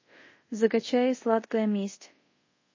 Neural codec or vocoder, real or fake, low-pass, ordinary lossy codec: codec, 24 kHz, 0.5 kbps, DualCodec; fake; 7.2 kHz; MP3, 32 kbps